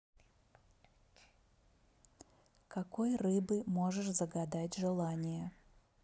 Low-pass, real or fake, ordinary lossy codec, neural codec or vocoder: none; real; none; none